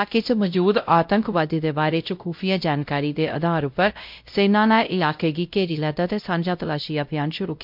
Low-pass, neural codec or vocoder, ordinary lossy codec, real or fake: 5.4 kHz; codec, 16 kHz, 0.5 kbps, X-Codec, WavLM features, trained on Multilingual LibriSpeech; MP3, 48 kbps; fake